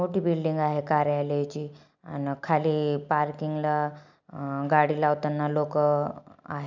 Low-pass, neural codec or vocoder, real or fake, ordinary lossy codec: 7.2 kHz; none; real; none